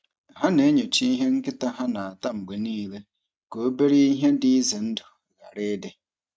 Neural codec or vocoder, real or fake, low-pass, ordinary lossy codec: none; real; none; none